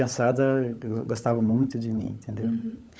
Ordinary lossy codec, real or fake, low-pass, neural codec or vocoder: none; fake; none; codec, 16 kHz, 16 kbps, FunCodec, trained on LibriTTS, 50 frames a second